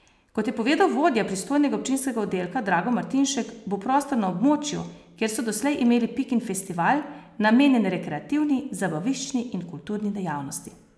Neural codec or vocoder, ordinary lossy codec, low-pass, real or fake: none; none; none; real